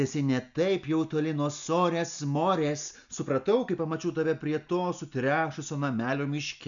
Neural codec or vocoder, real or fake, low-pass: none; real; 7.2 kHz